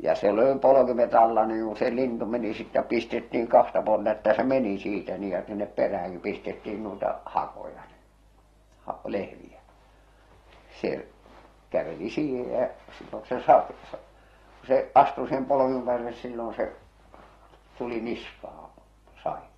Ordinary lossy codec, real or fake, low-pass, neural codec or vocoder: AAC, 32 kbps; real; 19.8 kHz; none